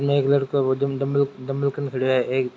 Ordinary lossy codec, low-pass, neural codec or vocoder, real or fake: none; none; none; real